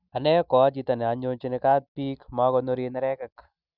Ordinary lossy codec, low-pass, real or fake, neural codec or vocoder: none; 5.4 kHz; real; none